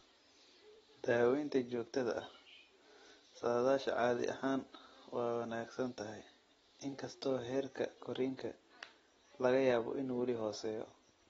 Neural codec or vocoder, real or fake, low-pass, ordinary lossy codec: none; real; 19.8 kHz; AAC, 24 kbps